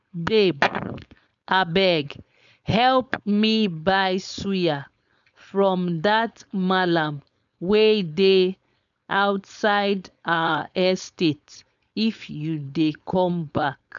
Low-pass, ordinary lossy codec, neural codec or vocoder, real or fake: 7.2 kHz; none; codec, 16 kHz, 4.8 kbps, FACodec; fake